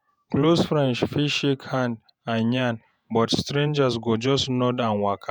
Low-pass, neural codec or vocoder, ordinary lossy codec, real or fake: 19.8 kHz; vocoder, 48 kHz, 128 mel bands, Vocos; none; fake